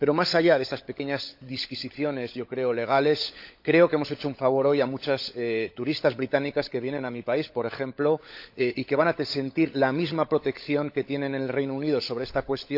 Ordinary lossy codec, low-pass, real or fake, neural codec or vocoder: AAC, 48 kbps; 5.4 kHz; fake; codec, 16 kHz, 16 kbps, FunCodec, trained on LibriTTS, 50 frames a second